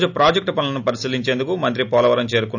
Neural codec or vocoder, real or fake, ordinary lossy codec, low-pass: none; real; none; 7.2 kHz